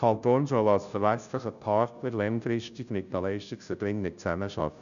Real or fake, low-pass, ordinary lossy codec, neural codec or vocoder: fake; 7.2 kHz; none; codec, 16 kHz, 0.5 kbps, FunCodec, trained on Chinese and English, 25 frames a second